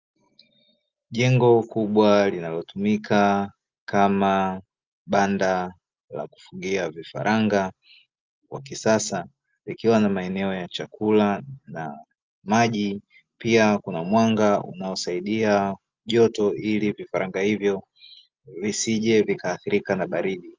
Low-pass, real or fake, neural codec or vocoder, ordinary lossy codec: 7.2 kHz; real; none; Opus, 24 kbps